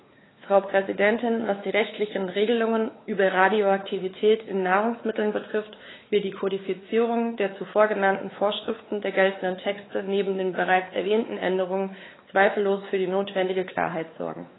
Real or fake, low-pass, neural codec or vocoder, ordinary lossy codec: fake; 7.2 kHz; codec, 16 kHz, 4 kbps, X-Codec, WavLM features, trained on Multilingual LibriSpeech; AAC, 16 kbps